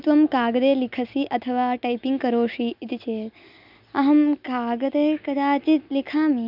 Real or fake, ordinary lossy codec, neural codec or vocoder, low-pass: real; none; none; 5.4 kHz